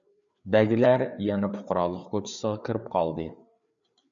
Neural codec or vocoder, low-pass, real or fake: codec, 16 kHz, 4 kbps, FreqCodec, larger model; 7.2 kHz; fake